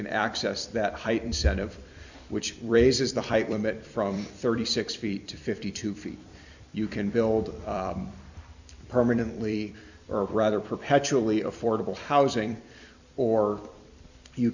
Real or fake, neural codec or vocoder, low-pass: fake; vocoder, 44.1 kHz, 128 mel bands every 256 samples, BigVGAN v2; 7.2 kHz